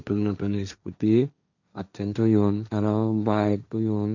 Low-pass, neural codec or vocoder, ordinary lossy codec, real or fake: 7.2 kHz; codec, 16 kHz, 1.1 kbps, Voila-Tokenizer; none; fake